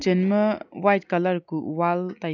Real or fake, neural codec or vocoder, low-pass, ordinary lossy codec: real; none; 7.2 kHz; none